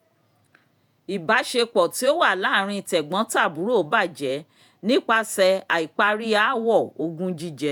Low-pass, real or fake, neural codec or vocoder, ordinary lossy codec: none; fake; vocoder, 48 kHz, 128 mel bands, Vocos; none